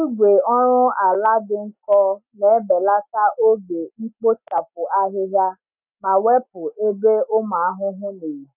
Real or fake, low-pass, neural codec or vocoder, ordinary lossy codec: real; 3.6 kHz; none; none